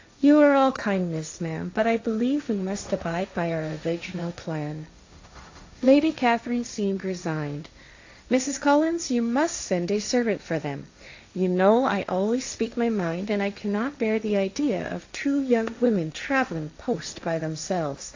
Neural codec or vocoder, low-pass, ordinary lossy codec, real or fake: codec, 16 kHz, 1.1 kbps, Voila-Tokenizer; 7.2 kHz; AAC, 48 kbps; fake